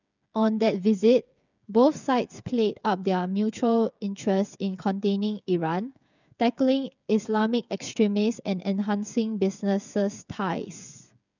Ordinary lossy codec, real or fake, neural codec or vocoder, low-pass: none; fake; codec, 16 kHz, 8 kbps, FreqCodec, smaller model; 7.2 kHz